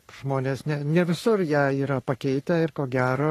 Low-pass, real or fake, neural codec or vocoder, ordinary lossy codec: 14.4 kHz; fake; codec, 44.1 kHz, 3.4 kbps, Pupu-Codec; AAC, 48 kbps